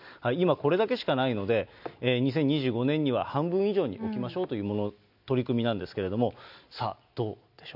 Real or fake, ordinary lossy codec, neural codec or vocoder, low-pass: real; none; none; 5.4 kHz